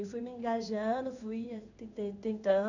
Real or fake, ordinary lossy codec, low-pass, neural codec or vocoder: fake; none; 7.2 kHz; codec, 16 kHz in and 24 kHz out, 1 kbps, XY-Tokenizer